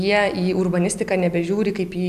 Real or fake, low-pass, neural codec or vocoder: real; 14.4 kHz; none